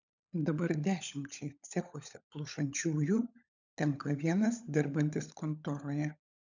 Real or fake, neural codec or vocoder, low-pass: fake; codec, 16 kHz, 16 kbps, FunCodec, trained on LibriTTS, 50 frames a second; 7.2 kHz